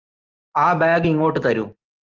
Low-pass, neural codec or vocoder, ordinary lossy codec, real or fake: 7.2 kHz; none; Opus, 32 kbps; real